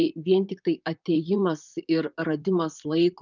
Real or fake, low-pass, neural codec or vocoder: fake; 7.2 kHz; vocoder, 44.1 kHz, 128 mel bands, Pupu-Vocoder